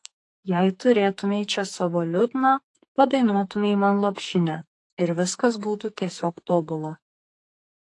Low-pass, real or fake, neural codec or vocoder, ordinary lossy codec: 10.8 kHz; fake; codec, 44.1 kHz, 2.6 kbps, SNAC; AAC, 48 kbps